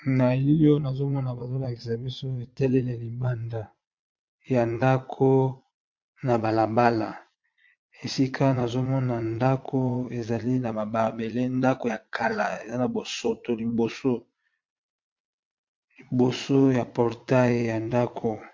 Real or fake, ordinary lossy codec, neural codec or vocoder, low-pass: fake; MP3, 48 kbps; vocoder, 22.05 kHz, 80 mel bands, Vocos; 7.2 kHz